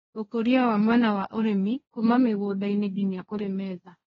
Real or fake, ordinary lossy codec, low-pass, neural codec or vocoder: fake; AAC, 24 kbps; 7.2 kHz; codec, 16 kHz, 1.1 kbps, Voila-Tokenizer